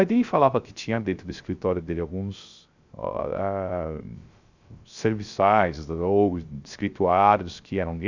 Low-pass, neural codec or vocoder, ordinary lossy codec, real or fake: 7.2 kHz; codec, 16 kHz, 0.3 kbps, FocalCodec; Opus, 64 kbps; fake